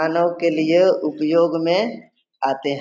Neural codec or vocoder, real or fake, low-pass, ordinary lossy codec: none; real; none; none